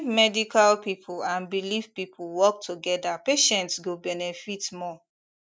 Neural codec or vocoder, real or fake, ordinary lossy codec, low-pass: none; real; none; none